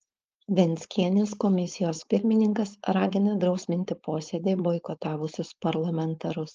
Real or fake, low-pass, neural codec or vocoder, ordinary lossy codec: fake; 7.2 kHz; codec, 16 kHz, 4.8 kbps, FACodec; Opus, 24 kbps